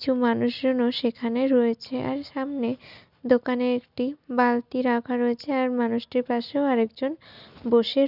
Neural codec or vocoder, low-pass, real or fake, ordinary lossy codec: none; 5.4 kHz; real; none